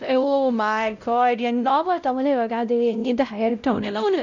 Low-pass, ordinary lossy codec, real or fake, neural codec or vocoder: 7.2 kHz; none; fake; codec, 16 kHz, 0.5 kbps, X-Codec, WavLM features, trained on Multilingual LibriSpeech